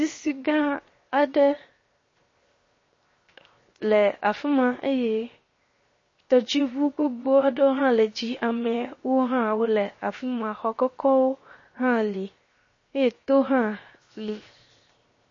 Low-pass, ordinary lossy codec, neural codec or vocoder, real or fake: 7.2 kHz; MP3, 32 kbps; codec, 16 kHz, 0.7 kbps, FocalCodec; fake